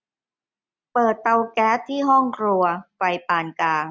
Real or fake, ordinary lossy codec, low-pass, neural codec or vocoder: real; none; none; none